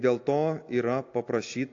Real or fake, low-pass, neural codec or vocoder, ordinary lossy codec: real; 7.2 kHz; none; AAC, 48 kbps